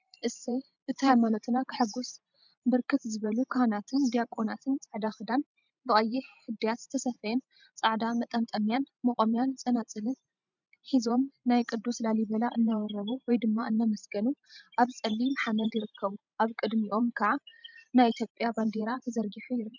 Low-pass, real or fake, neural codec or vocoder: 7.2 kHz; fake; vocoder, 44.1 kHz, 128 mel bands every 512 samples, BigVGAN v2